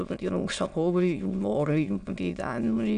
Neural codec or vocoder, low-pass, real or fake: autoencoder, 22.05 kHz, a latent of 192 numbers a frame, VITS, trained on many speakers; 9.9 kHz; fake